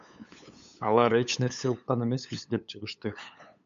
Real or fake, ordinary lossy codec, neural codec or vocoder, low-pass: fake; MP3, 64 kbps; codec, 16 kHz, 8 kbps, FunCodec, trained on LibriTTS, 25 frames a second; 7.2 kHz